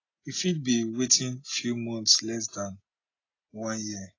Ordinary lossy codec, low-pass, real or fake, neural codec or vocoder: AAC, 32 kbps; 7.2 kHz; real; none